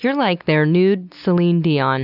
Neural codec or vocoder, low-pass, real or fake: none; 5.4 kHz; real